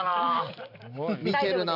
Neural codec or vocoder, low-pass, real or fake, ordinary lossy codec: none; 5.4 kHz; real; none